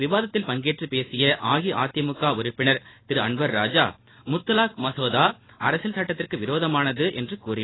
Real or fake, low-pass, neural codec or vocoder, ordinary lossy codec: real; 7.2 kHz; none; AAC, 16 kbps